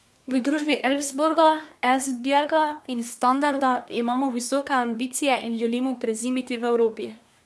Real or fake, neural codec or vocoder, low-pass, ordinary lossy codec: fake; codec, 24 kHz, 1 kbps, SNAC; none; none